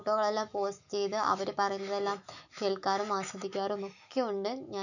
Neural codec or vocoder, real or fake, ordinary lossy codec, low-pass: autoencoder, 48 kHz, 128 numbers a frame, DAC-VAE, trained on Japanese speech; fake; none; 7.2 kHz